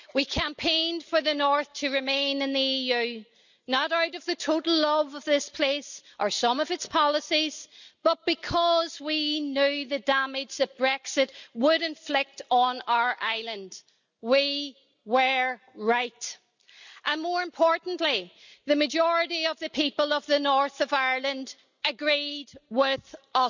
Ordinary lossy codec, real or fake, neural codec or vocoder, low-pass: none; real; none; 7.2 kHz